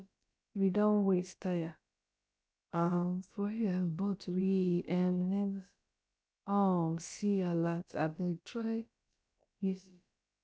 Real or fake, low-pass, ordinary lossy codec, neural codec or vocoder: fake; none; none; codec, 16 kHz, about 1 kbps, DyCAST, with the encoder's durations